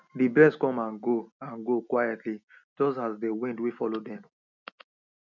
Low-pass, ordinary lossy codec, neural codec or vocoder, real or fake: 7.2 kHz; none; none; real